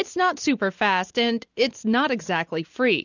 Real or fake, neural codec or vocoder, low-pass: real; none; 7.2 kHz